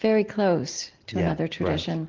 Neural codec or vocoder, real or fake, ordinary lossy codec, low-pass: none; real; Opus, 16 kbps; 7.2 kHz